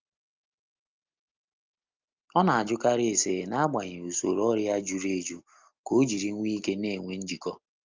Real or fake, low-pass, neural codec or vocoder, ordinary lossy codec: real; 7.2 kHz; none; Opus, 32 kbps